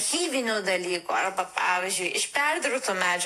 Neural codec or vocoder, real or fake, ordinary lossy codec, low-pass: vocoder, 48 kHz, 128 mel bands, Vocos; fake; AAC, 64 kbps; 14.4 kHz